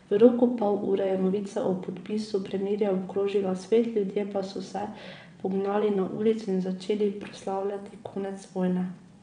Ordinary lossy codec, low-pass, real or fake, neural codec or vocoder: none; 9.9 kHz; fake; vocoder, 22.05 kHz, 80 mel bands, WaveNeXt